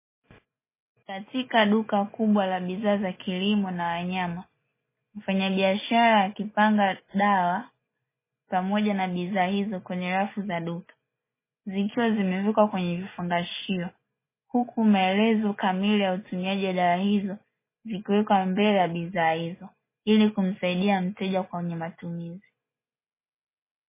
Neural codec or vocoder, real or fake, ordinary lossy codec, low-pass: none; real; MP3, 16 kbps; 3.6 kHz